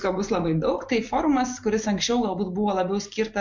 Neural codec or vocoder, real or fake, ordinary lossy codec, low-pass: none; real; MP3, 48 kbps; 7.2 kHz